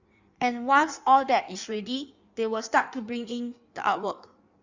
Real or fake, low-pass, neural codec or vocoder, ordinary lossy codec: fake; 7.2 kHz; codec, 16 kHz in and 24 kHz out, 1.1 kbps, FireRedTTS-2 codec; Opus, 64 kbps